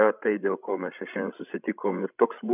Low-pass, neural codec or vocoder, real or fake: 3.6 kHz; codec, 16 kHz, 16 kbps, FreqCodec, larger model; fake